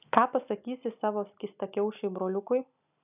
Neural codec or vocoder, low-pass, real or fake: none; 3.6 kHz; real